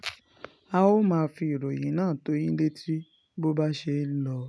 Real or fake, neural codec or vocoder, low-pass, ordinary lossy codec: real; none; none; none